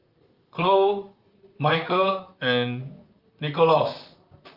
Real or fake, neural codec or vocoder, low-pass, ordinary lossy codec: fake; vocoder, 44.1 kHz, 128 mel bands, Pupu-Vocoder; 5.4 kHz; Opus, 24 kbps